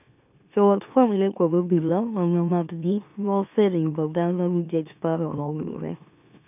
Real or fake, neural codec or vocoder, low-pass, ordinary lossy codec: fake; autoencoder, 44.1 kHz, a latent of 192 numbers a frame, MeloTTS; 3.6 kHz; none